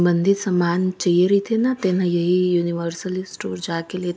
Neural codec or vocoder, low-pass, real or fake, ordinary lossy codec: none; none; real; none